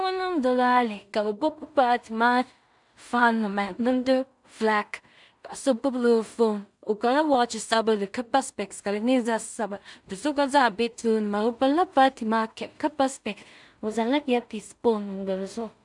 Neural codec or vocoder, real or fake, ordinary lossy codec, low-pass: codec, 16 kHz in and 24 kHz out, 0.4 kbps, LongCat-Audio-Codec, two codebook decoder; fake; MP3, 96 kbps; 10.8 kHz